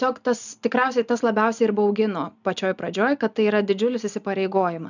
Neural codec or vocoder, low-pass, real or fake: none; 7.2 kHz; real